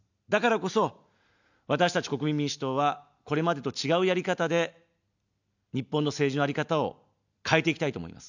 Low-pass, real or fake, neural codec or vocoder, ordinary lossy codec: 7.2 kHz; real; none; none